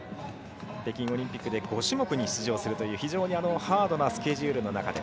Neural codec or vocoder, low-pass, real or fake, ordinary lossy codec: none; none; real; none